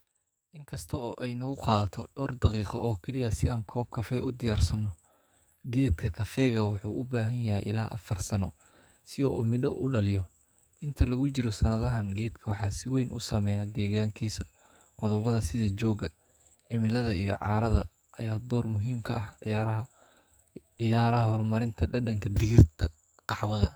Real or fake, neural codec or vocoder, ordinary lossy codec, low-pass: fake; codec, 44.1 kHz, 2.6 kbps, SNAC; none; none